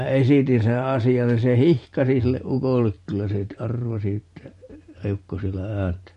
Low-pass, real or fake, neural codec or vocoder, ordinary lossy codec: 14.4 kHz; fake; vocoder, 44.1 kHz, 128 mel bands every 512 samples, BigVGAN v2; MP3, 48 kbps